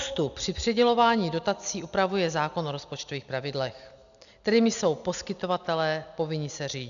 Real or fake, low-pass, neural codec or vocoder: real; 7.2 kHz; none